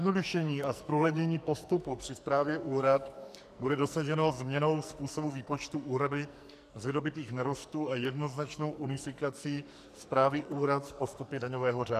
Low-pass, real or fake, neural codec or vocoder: 14.4 kHz; fake; codec, 44.1 kHz, 2.6 kbps, SNAC